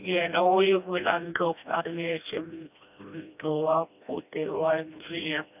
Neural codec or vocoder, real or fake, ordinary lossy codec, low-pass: codec, 16 kHz, 1 kbps, FreqCodec, smaller model; fake; none; 3.6 kHz